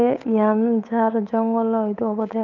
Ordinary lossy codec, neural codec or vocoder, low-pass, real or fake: none; codec, 16 kHz, 8 kbps, FunCodec, trained on Chinese and English, 25 frames a second; 7.2 kHz; fake